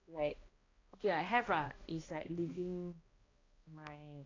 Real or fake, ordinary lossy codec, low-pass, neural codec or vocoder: fake; AAC, 32 kbps; 7.2 kHz; codec, 16 kHz, 1 kbps, X-Codec, HuBERT features, trained on balanced general audio